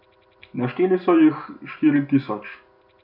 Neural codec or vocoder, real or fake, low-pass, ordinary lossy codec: none; real; 5.4 kHz; none